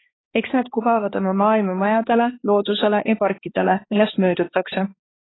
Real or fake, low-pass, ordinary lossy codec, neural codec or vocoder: fake; 7.2 kHz; AAC, 16 kbps; codec, 16 kHz, 2 kbps, X-Codec, HuBERT features, trained on balanced general audio